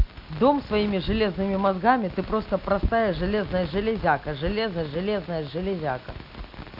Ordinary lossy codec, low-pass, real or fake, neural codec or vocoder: AAC, 48 kbps; 5.4 kHz; real; none